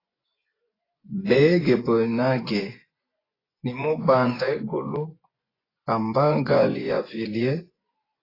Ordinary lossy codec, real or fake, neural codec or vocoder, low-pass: AAC, 24 kbps; fake; vocoder, 24 kHz, 100 mel bands, Vocos; 5.4 kHz